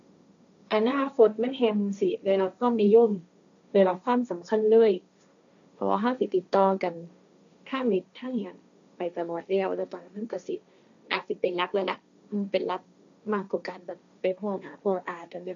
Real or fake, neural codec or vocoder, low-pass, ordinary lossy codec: fake; codec, 16 kHz, 1.1 kbps, Voila-Tokenizer; 7.2 kHz; none